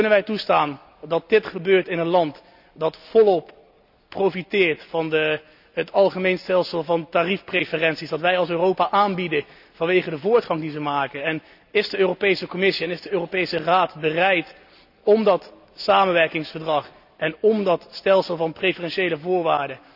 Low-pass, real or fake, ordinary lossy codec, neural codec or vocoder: 5.4 kHz; real; none; none